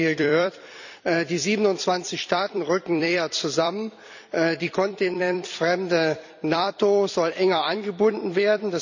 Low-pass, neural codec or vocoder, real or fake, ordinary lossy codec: 7.2 kHz; vocoder, 44.1 kHz, 128 mel bands every 256 samples, BigVGAN v2; fake; none